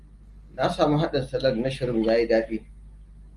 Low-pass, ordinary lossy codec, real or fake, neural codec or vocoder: 10.8 kHz; Opus, 32 kbps; real; none